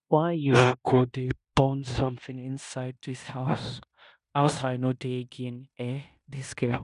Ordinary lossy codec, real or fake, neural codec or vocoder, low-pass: none; fake; codec, 16 kHz in and 24 kHz out, 0.9 kbps, LongCat-Audio-Codec, fine tuned four codebook decoder; 10.8 kHz